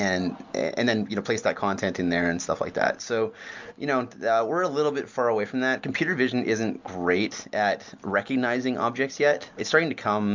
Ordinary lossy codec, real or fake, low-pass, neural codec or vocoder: MP3, 64 kbps; real; 7.2 kHz; none